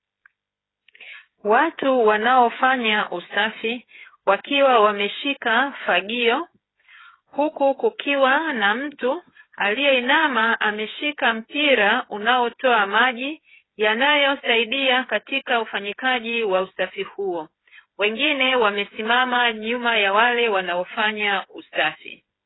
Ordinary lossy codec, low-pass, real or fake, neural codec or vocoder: AAC, 16 kbps; 7.2 kHz; fake; codec, 16 kHz, 8 kbps, FreqCodec, smaller model